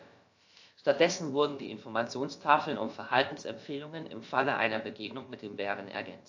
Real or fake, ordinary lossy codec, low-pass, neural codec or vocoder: fake; AAC, 48 kbps; 7.2 kHz; codec, 16 kHz, about 1 kbps, DyCAST, with the encoder's durations